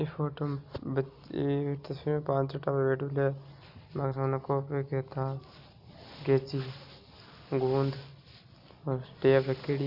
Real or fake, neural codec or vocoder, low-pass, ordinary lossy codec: real; none; 5.4 kHz; none